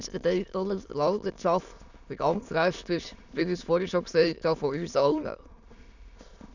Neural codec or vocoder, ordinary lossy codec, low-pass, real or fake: autoencoder, 22.05 kHz, a latent of 192 numbers a frame, VITS, trained on many speakers; none; 7.2 kHz; fake